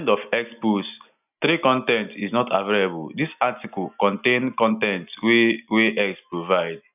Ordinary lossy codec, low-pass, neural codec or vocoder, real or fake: none; 3.6 kHz; none; real